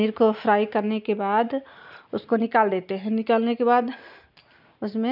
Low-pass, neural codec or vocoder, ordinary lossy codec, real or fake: 5.4 kHz; none; none; real